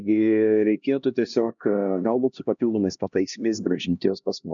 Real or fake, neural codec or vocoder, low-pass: fake; codec, 16 kHz, 1 kbps, X-Codec, HuBERT features, trained on LibriSpeech; 7.2 kHz